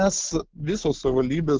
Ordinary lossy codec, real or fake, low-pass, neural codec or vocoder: Opus, 16 kbps; real; 7.2 kHz; none